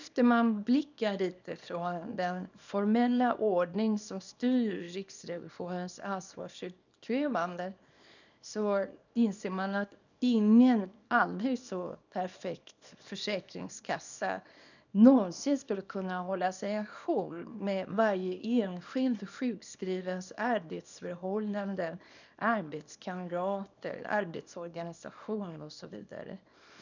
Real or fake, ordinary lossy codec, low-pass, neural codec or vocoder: fake; none; 7.2 kHz; codec, 24 kHz, 0.9 kbps, WavTokenizer, small release